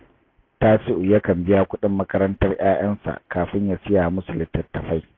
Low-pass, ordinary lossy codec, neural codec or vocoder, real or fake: 7.2 kHz; AAC, 32 kbps; none; real